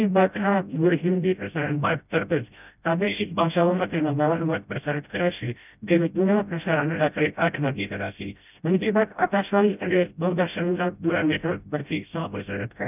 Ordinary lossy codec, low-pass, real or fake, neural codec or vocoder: none; 3.6 kHz; fake; codec, 16 kHz, 0.5 kbps, FreqCodec, smaller model